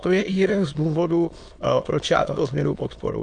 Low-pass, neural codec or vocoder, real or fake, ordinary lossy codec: 9.9 kHz; autoencoder, 22.05 kHz, a latent of 192 numbers a frame, VITS, trained on many speakers; fake; AAC, 64 kbps